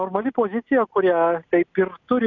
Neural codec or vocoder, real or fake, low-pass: none; real; 7.2 kHz